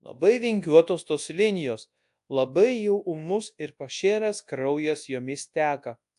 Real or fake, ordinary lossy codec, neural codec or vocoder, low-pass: fake; AAC, 64 kbps; codec, 24 kHz, 0.9 kbps, WavTokenizer, large speech release; 10.8 kHz